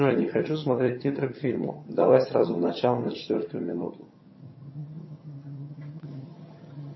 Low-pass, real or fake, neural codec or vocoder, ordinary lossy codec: 7.2 kHz; fake; vocoder, 22.05 kHz, 80 mel bands, HiFi-GAN; MP3, 24 kbps